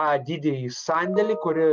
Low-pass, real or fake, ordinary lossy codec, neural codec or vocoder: 7.2 kHz; real; Opus, 24 kbps; none